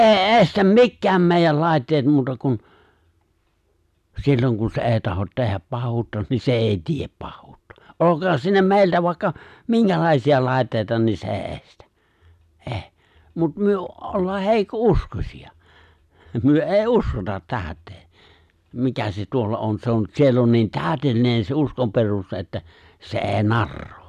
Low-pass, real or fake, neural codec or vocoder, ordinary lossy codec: 14.4 kHz; real; none; none